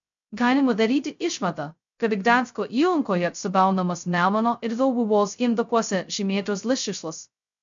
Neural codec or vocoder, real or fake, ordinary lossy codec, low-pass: codec, 16 kHz, 0.2 kbps, FocalCodec; fake; MP3, 64 kbps; 7.2 kHz